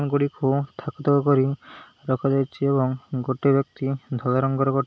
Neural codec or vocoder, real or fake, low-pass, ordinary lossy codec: none; real; none; none